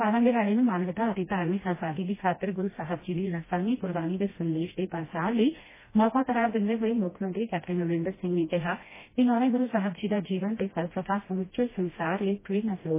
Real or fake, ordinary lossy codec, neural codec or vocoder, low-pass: fake; MP3, 16 kbps; codec, 16 kHz, 1 kbps, FreqCodec, smaller model; 3.6 kHz